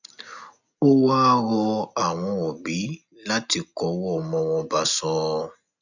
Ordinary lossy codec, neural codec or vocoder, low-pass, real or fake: none; none; 7.2 kHz; real